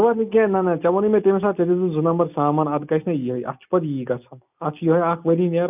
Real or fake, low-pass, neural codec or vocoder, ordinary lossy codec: real; 3.6 kHz; none; none